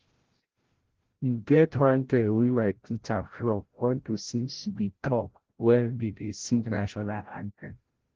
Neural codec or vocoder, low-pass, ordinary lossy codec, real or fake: codec, 16 kHz, 0.5 kbps, FreqCodec, larger model; 7.2 kHz; Opus, 16 kbps; fake